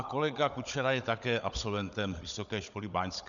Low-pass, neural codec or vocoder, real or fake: 7.2 kHz; codec, 16 kHz, 16 kbps, FunCodec, trained on Chinese and English, 50 frames a second; fake